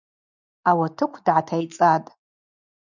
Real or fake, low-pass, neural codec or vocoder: real; 7.2 kHz; none